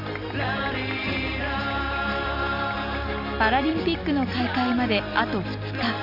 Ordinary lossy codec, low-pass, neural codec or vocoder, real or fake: Opus, 64 kbps; 5.4 kHz; none; real